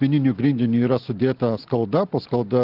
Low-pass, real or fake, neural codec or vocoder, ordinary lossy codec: 5.4 kHz; real; none; Opus, 16 kbps